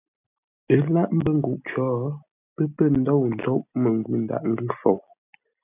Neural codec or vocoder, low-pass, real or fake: none; 3.6 kHz; real